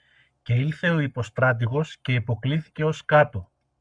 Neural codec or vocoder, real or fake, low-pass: codec, 44.1 kHz, 7.8 kbps, Pupu-Codec; fake; 9.9 kHz